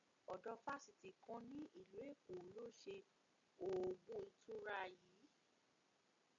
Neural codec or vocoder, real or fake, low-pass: none; real; 7.2 kHz